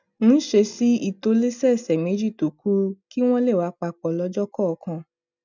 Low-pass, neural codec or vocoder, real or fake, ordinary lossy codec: 7.2 kHz; none; real; none